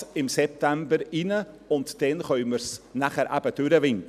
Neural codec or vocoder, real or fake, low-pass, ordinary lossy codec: none; real; 14.4 kHz; none